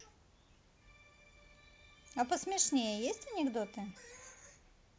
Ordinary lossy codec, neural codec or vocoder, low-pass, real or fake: none; none; none; real